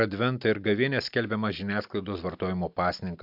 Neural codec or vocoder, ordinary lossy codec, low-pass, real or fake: none; AAC, 48 kbps; 5.4 kHz; real